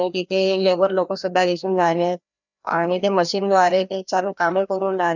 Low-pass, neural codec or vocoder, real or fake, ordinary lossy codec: 7.2 kHz; codec, 16 kHz, 1 kbps, FreqCodec, larger model; fake; none